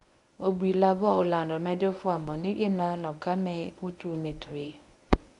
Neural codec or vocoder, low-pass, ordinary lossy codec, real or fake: codec, 24 kHz, 0.9 kbps, WavTokenizer, medium speech release version 1; 10.8 kHz; none; fake